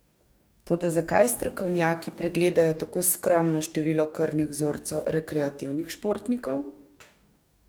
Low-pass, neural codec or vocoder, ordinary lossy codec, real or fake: none; codec, 44.1 kHz, 2.6 kbps, DAC; none; fake